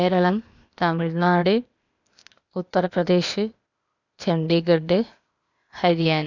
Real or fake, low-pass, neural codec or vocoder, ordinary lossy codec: fake; 7.2 kHz; codec, 16 kHz, 0.8 kbps, ZipCodec; none